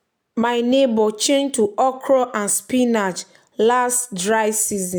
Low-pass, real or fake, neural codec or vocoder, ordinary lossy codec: none; real; none; none